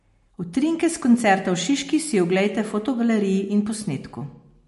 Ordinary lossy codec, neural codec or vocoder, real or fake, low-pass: MP3, 48 kbps; none; real; 14.4 kHz